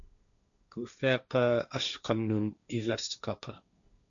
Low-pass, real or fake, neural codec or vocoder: 7.2 kHz; fake; codec, 16 kHz, 1.1 kbps, Voila-Tokenizer